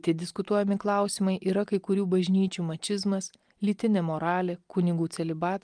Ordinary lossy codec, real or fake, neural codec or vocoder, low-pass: Opus, 32 kbps; real; none; 9.9 kHz